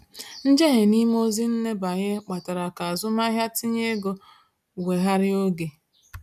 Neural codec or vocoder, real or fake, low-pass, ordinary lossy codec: none; real; 14.4 kHz; none